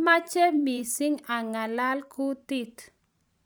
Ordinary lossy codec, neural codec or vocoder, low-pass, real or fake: none; vocoder, 44.1 kHz, 128 mel bands every 512 samples, BigVGAN v2; none; fake